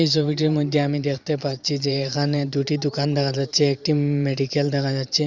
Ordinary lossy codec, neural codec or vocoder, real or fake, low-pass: Opus, 64 kbps; vocoder, 44.1 kHz, 128 mel bands every 512 samples, BigVGAN v2; fake; 7.2 kHz